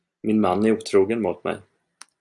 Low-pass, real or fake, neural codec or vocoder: 10.8 kHz; real; none